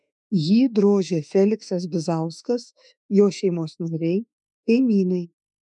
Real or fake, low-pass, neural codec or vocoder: fake; 10.8 kHz; autoencoder, 48 kHz, 32 numbers a frame, DAC-VAE, trained on Japanese speech